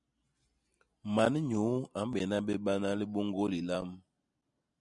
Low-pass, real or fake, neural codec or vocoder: 10.8 kHz; real; none